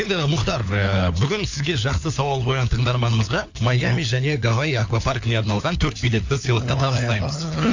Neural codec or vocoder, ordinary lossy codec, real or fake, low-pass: codec, 16 kHz, 4 kbps, FunCodec, trained on LibriTTS, 50 frames a second; AAC, 48 kbps; fake; 7.2 kHz